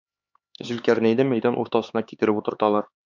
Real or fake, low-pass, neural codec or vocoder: fake; 7.2 kHz; codec, 16 kHz, 4 kbps, X-Codec, HuBERT features, trained on LibriSpeech